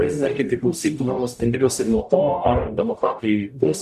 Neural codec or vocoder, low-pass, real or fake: codec, 44.1 kHz, 0.9 kbps, DAC; 14.4 kHz; fake